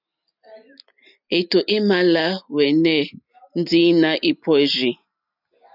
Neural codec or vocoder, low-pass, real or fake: none; 5.4 kHz; real